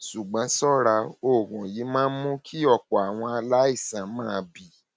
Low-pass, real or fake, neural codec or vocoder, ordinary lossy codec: none; real; none; none